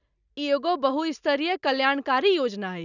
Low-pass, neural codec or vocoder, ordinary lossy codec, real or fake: 7.2 kHz; none; none; real